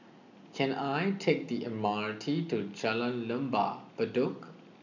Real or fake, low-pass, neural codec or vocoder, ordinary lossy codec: real; 7.2 kHz; none; none